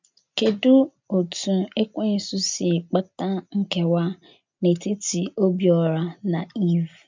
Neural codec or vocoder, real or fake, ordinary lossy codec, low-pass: none; real; MP3, 64 kbps; 7.2 kHz